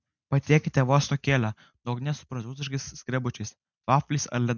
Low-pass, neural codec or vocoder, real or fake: 7.2 kHz; none; real